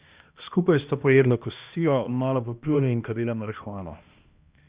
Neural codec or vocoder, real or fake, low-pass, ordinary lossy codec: codec, 16 kHz, 1 kbps, X-Codec, HuBERT features, trained on balanced general audio; fake; 3.6 kHz; Opus, 64 kbps